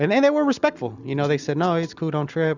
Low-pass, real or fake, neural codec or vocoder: 7.2 kHz; real; none